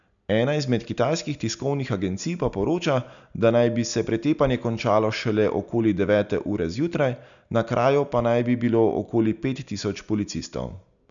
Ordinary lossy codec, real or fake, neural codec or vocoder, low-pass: none; real; none; 7.2 kHz